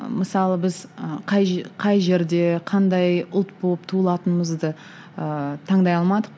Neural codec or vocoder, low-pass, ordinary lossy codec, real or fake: none; none; none; real